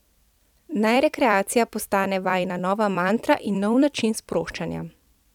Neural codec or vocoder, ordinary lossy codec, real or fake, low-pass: vocoder, 44.1 kHz, 128 mel bands every 256 samples, BigVGAN v2; none; fake; 19.8 kHz